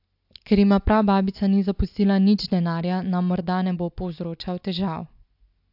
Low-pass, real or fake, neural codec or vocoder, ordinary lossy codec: 5.4 kHz; real; none; AAC, 48 kbps